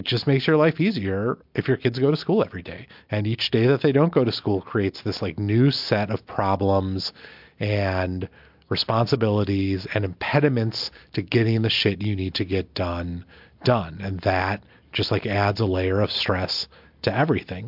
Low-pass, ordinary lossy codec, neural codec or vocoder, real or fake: 5.4 kHz; MP3, 48 kbps; none; real